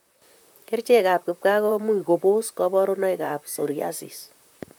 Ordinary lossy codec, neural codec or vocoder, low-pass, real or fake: none; vocoder, 44.1 kHz, 128 mel bands, Pupu-Vocoder; none; fake